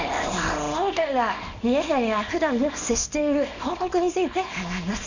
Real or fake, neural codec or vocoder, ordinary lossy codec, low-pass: fake; codec, 24 kHz, 0.9 kbps, WavTokenizer, small release; none; 7.2 kHz